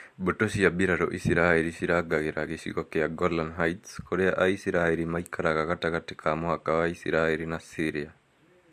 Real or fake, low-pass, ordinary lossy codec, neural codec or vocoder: real; 14.4 kHz; MP3, 64 kbps; none